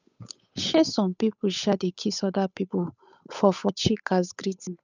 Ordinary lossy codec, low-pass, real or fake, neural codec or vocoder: none; 7.2 kHz; fake; codec, 16 kHz, 8 kbps, FunCodec, trained on Chinese and English, 25 frames a second